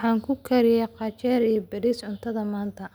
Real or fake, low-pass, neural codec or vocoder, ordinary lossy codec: real; none; none; none